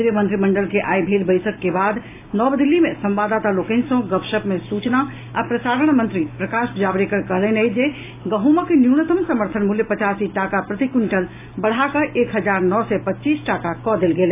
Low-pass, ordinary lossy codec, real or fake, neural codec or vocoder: 3.6 kHz; AAC, 24 kbps; real; none